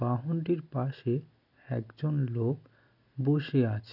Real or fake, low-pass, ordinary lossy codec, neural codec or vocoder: real; 5.4 kHz; none; none